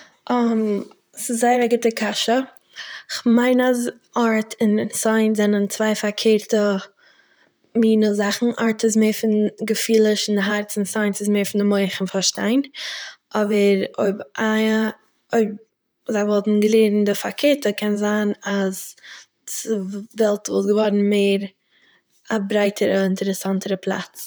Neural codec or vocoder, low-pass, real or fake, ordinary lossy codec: vocoder, 44.1 kHz, 128 mel bands, Pupu-Vocoder; none; fake; none